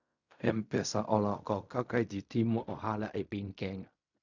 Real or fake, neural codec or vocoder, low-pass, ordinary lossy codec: fake; codec, 16 kHz in and 24 kHz out, 0.4 kbps, LongCat-Audio-Codec, fine tuned four codebook decoder; 7.2 kHz; none